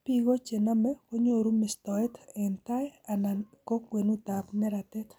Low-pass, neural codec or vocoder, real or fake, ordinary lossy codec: none; none; real; none